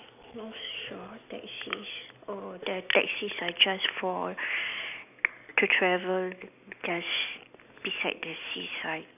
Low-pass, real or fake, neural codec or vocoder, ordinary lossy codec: 3.6 kHz; real; none; none